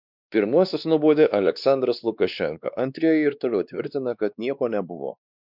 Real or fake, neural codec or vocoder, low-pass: fake; codec, 16 kHz, 2 kbps, X-Codec, WavLM features, trained on Multilingual LibriSpeech; 5.4 kHz